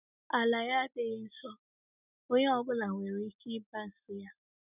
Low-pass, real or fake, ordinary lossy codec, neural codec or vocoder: 3.6 kHz; real; none; none